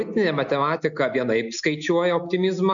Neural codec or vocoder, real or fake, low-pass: none; real; 7.2 kHz